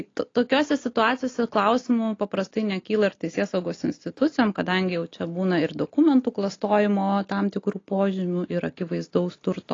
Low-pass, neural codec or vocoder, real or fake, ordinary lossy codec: 7.2 kHz; none; real; AAC, 32 kbps